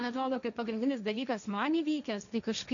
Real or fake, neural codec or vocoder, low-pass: fake; codec, 16 kHz, 1.1 kbps, Voila-Tokenizer; 7.2 kHz